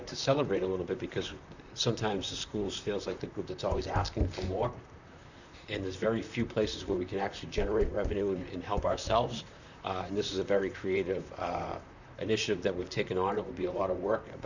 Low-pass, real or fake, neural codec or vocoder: 7.2 kHz; fake; vocoder, 44.1 kHz, 128 mel bands, Pupu-Vocoder